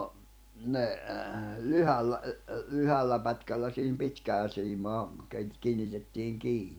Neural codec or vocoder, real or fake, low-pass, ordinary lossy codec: vocoder, 44.1 kHz, 128 mel bands every 256 samples, BigVGAN v2; fake; none; none